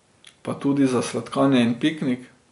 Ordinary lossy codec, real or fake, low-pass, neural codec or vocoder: MP3, 64 kbps; real; 10.8 kHz; none